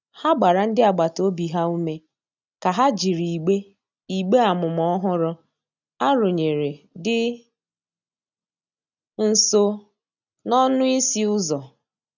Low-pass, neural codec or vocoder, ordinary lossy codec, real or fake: 7.2 kHz; none; none; real